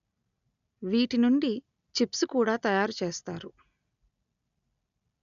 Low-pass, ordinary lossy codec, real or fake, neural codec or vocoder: 7.2 kHz; none; real; none